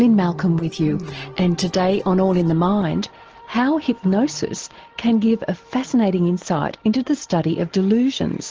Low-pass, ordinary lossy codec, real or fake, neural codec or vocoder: 7.2 kHz; Opus, 16 kbps; real; none